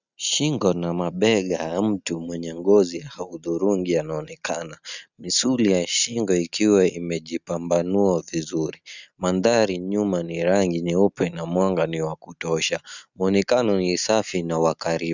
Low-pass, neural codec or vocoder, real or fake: 7.2 kHz; none; real